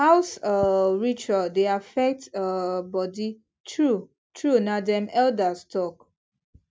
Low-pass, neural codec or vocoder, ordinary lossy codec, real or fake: none; none; none; real